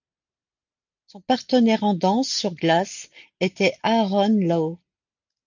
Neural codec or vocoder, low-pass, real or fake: none; 7.2 kHz; real